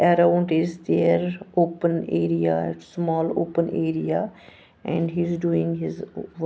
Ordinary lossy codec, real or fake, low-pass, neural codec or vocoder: none; real; none; none